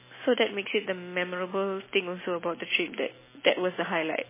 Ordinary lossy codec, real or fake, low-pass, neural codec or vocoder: MP3, 16 kbps; real; 3.6 kHz; none